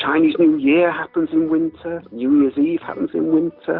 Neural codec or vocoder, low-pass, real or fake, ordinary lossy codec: none; 5.4 kHz; real; Opus, 24 kbps